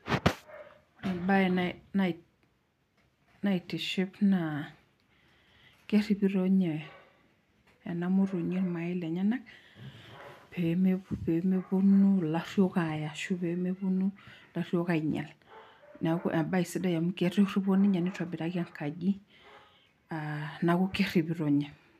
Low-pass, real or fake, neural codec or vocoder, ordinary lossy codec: 14.4 kHz; real; none; none